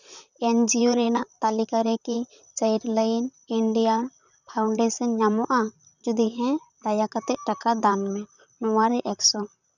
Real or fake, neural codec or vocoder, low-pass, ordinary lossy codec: fake; vocoder, 44.1 kHz, 128 mel bands, Pupu-Vocoder; 7.2 kHz; none